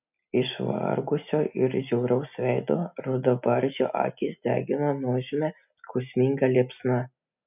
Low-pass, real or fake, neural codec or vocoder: 3.6 kHz; real; none